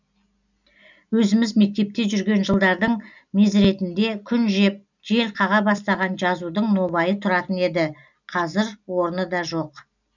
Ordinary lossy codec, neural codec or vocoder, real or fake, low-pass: none; none; real; 7.2 kHz